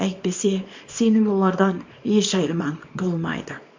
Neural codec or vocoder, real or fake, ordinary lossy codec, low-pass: codec, 24 kHz, 0.9 kbps, WavTokenizer, small release; fake; MP3, 48 kbps; 7.2 kHz